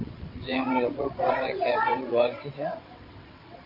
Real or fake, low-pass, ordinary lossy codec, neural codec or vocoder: fake; 5.4 kHz; MP3, 32 kbps; vocoder, 22.05 kHz, 80 mel bands, WaveNeXt